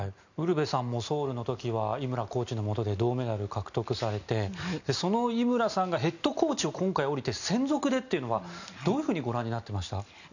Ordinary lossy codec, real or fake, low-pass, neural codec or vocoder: none; real; 7.2 kHz; none